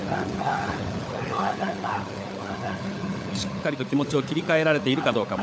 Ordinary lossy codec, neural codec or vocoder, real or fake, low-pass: none; codec, 16 kHz, 16 kbps, FunCodec, trained on LibriTTS, 50 frames a second; fake; none